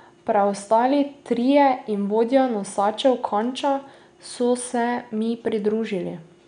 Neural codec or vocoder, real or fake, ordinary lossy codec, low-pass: none; real; none; 9.9 kHz